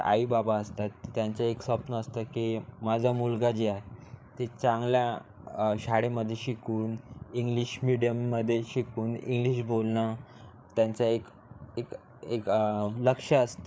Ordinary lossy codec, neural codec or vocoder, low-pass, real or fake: none; codec, 16 kHz, 8 kbps, FreqCodec, larger model; none; fake